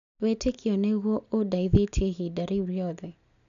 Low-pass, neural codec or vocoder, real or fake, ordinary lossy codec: 7.2 kHz; none; real; none